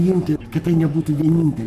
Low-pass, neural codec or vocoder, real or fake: 14.4 kHz; codec, 44.1 kHz, 7.8 kbps, Pupu-Codec; fake